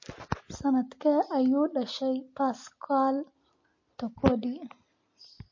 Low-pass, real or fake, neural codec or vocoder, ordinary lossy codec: 7.2 kHz; real; none; MP3, 32 kbps